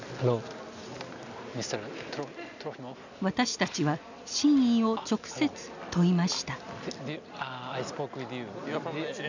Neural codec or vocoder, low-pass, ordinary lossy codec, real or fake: none; 7.2 kHz; none; real